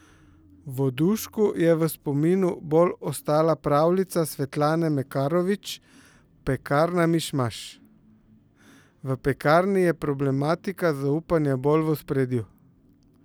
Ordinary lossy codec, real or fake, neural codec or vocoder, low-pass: none; real; none; none